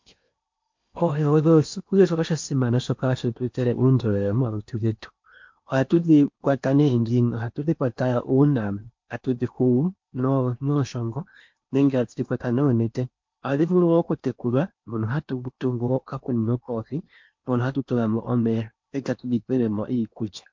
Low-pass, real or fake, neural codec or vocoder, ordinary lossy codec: 7.2 kHz; fake; codec, 16 kHz in and 24 kHz out, 0.8 kbps, FocalCodec, streaming, 65536 codes; MP3, 48 kbps